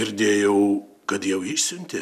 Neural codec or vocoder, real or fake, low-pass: none; real; 14.4 kHz